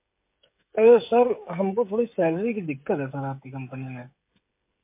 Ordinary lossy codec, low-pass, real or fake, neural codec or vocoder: MP3, 24 kbps; 3.6 kHz; fake; codec, 16 kHz, 16 kbps, FreqCodec, smaller model